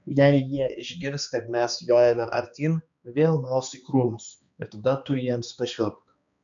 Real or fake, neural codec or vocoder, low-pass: fake; codec, 16 kHz, 2 kbps, X-Codec, HuBERT features, trained on balanced general audio; 7.2 kHz